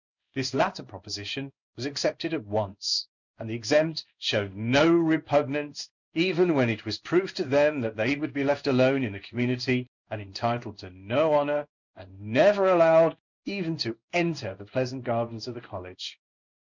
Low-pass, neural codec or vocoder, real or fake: 7.2 kHz; codec, 16 kHz in and 24 kHz out, 1 kbps, XY-Tokenizer; fake